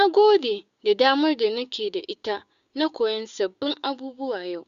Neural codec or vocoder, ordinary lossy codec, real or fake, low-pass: codec, 16 kHz, 6 kbps, DAC; Opus, 64 kbps; fake; 7.2 kHz